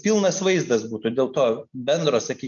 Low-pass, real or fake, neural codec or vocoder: 7.2 kHz; real; none